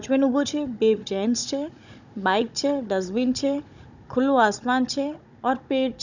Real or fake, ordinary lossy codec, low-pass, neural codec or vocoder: fake; none; 7.2 kHz; codec, 16 kHz, 16 kbps, FunCodec, trained on Chinese and English, 50 frames a second